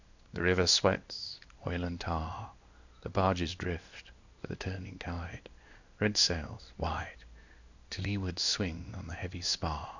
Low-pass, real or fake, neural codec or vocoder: 7.2 kHz; fake; codec, 16 kHz in and 24 kHz out, 1 kbps, XY-Tokenizer